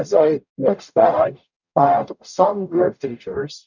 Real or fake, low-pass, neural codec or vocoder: fake; 7.2 kHz; codec, 44.1 kHz, 0.9 kbps, DAC